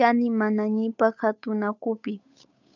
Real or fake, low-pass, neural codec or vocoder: fake; 7.2 kHz; codec, 16 kHz, 8 kbps, FunCodec, trained on Chinese and English, 25 frames a second